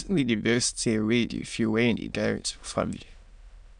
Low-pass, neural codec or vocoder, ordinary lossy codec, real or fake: 9.9 kHz; autoencoder, 22.05 kHz, a latent of 192 numbers a frame, VITS, trained on many speakers; none; fake